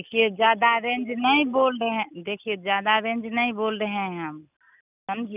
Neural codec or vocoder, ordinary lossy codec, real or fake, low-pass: none; none; real; 3.6 kHz